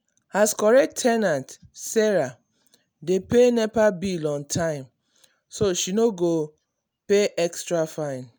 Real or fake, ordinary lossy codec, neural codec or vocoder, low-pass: real; none; none; none